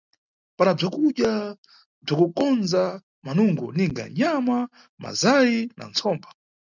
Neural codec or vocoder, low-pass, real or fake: none; 7.2 kHz; real